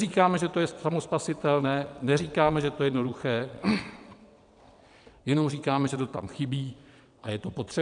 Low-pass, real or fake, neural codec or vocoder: 9.9 kHz; fake; vocoder, 22.05 kHz, 80 mel bands, WaveNeXt